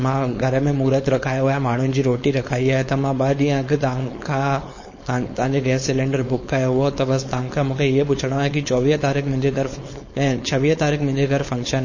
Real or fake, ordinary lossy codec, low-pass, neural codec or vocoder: fake; MP3, 32 kbps; 7.2 kHz; codec, 16 kHz, 4.8 kbps, FACodec